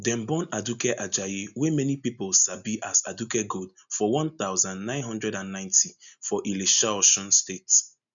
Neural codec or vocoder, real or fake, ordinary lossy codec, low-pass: none; real; MP3, 96 kbps; 7.2 kHz